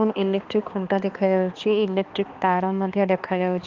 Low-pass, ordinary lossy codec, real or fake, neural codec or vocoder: 7.2 kHz; Opus, 32 kbps; fake; codec, 16 kHz, 2 kbps, X-Codec, HuBERT features, trained on balanced general audio